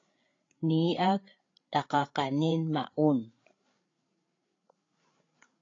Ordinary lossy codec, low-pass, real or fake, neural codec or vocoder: AAC, 32 kbps; 7.2 kHz; fake; codec, 16 kHz, 8 kbps, FreqCodec, larger model